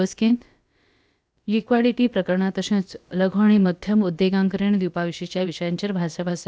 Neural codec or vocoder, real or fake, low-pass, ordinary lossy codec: codec, 16 kHz, about 1 kbps, DyCAST, with the encoder's durations; fake; none; none